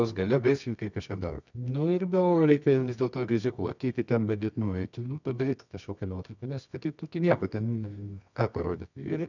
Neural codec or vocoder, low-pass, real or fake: codec, 24 kHz, 0.9 kbps, WavTokenizer, medium music audio release; 7.2 kHz; fake